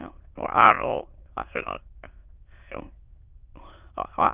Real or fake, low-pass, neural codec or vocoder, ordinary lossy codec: fake; 3.6 kHz; autoencoder, 22.05 kHz, a latent of 192 numbers a frame, VITS, trained on many speakers; Opus, 32 kbps